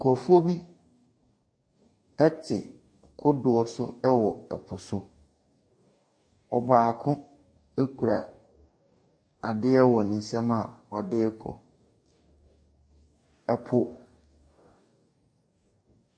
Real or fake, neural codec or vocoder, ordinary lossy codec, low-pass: fake; codec, 44.1 kHz, 2.6 kbps, DAC; MP3, 48 kbps; 9.9 kHz